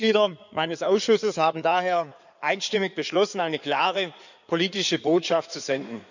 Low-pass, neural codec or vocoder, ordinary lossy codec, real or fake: 7.2 kHz; codec, 16 kHz in and 24 kHz out, 2.2 kbps, FireRedTTS-2 codec; none; fake